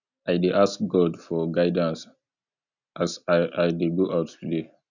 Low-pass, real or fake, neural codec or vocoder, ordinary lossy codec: 7.2 kHz; real; none; none